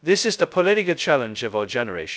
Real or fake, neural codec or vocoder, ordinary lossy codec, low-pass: fake; codec, 16 kHz, 0.2 kbps, FocalCodec; none; none